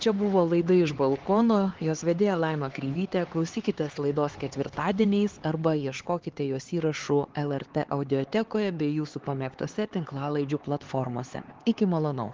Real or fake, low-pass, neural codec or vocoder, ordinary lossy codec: fake; 7.2 kHz; codec, 16 kHz, 4 kbps, X-Codec, HuBERT features, trained on LibriSpeech; Opus, 16 kbps